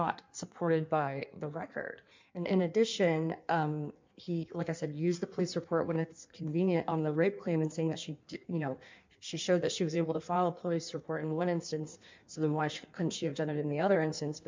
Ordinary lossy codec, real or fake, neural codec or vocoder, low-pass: MP3, 64 kbps; fake; codec, 16 kHz in and 24 kHz out, 1.1 kbps, FireRedTTS-2 codec; 7.2 kHz